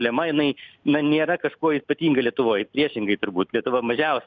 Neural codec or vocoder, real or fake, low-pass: none; real; 7.2 kHz